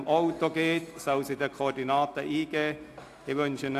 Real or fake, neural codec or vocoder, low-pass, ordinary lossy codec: real; none; 14.4 kHz; AAC, 64 kbps